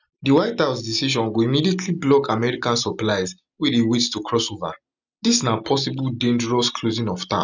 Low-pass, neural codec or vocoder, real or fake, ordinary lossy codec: 7.2 kHz; none; real; none